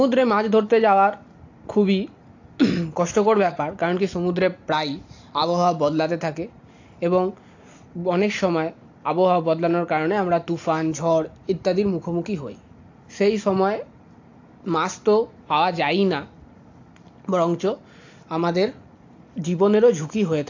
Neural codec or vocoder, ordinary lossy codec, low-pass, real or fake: none; AAC, 48 kbps; 7.2 kHz; real